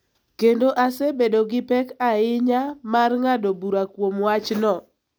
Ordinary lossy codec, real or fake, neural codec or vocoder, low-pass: none; real; none; none